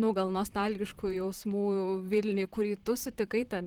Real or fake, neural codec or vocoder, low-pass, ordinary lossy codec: fake; vocoder, 44.1 kHz, 128 mel bands, Pupu-Vocoder; 19.8 kHz; Opus, 16 kbps